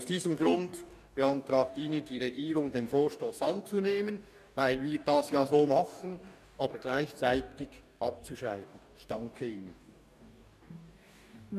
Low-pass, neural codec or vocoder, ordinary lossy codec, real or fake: 14.4 kHz; codec, 44.1 kHz, 2.6 kbps, DAC; none; fake